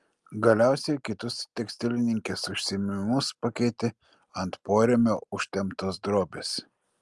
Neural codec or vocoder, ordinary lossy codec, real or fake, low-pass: none; Opus, 32 kbps; real; 10.8 kHz